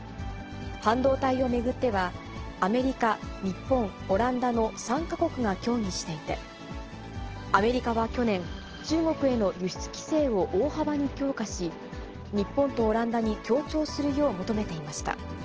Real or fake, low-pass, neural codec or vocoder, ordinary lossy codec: real; 7.2 kHz; none; Opus, 16 kbps